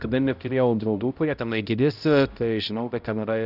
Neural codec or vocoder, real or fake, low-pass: codec, 16 kHz, 0.5 kbps, X-Codec, HuBERT features, trained on balanced general audio; fake; 5.4 kHz